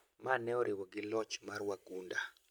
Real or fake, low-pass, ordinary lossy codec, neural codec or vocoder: real; none; none; none